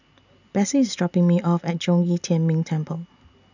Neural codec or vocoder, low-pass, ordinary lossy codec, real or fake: none; 7.2 kHz; none; real